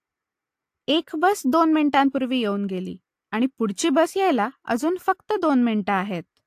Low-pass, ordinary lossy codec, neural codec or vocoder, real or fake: 14.4 kHz; AAC, 64 kbps; none; real